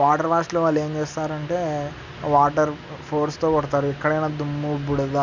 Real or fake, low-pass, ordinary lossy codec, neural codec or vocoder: real; 7.2 kHz; none; none